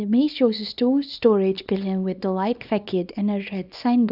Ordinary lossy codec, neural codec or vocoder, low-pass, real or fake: none; codec, 24 kHz, 0.9 kbps, WavTokenizer, small release; 5.4 kHz; fake